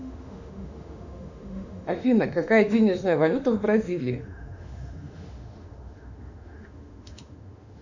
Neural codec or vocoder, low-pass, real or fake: autoencoder, 48 kHz, 32 numbers a frame, DAC-VAE, trained on Japanese speech; 7.2 kHz; fake